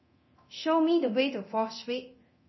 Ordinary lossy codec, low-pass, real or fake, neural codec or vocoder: MP3, 24 kbps; 7.2 kHz; fake; codec, 24 kHz, 0.9 kbps, DualCodec